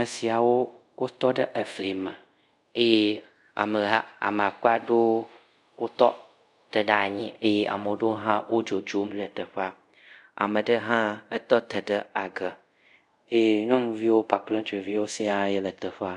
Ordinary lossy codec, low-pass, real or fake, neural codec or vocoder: MP3, 96 kbps; 10.8 kHz; fake; codec, 24 kHz, 0.5 kbps, DualCodec